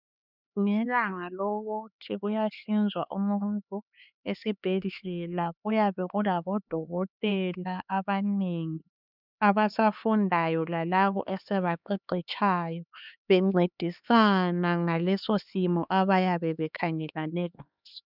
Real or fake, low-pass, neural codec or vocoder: fake; 5.4 kHz; codec, 16 kHz, 4 kbps, X-Codec, HuBERT features, trained on LibriSpeech